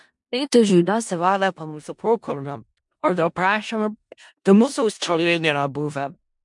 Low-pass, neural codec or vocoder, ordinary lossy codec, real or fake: 10.8 kHz; codec, 16 kHz in and 24 kHz out, 0.4 kbps, LongCat-Audio-Codec, four codebook decoder; MP3, 64 kbps; fake